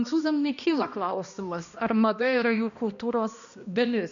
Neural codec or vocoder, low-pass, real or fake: codec, 16 kHz, 1 kbps, X-Codec, HuBERT features, trained on balanced general audio; 7.2 kHz; fake